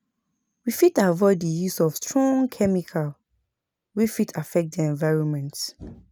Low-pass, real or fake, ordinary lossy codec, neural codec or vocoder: none; real; none; none